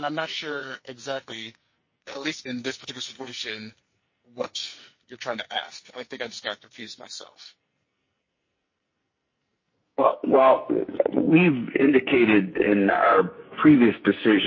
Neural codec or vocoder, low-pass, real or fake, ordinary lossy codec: codec, 44.1 kHz, 2.6 kbps, SNAC; 7.2 kHz; fake; MP3, 32 kbps